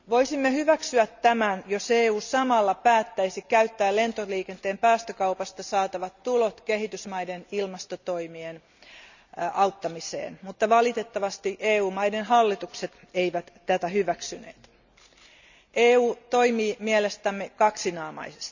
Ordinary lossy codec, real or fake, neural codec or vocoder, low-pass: none; real; none; 7.2 kHz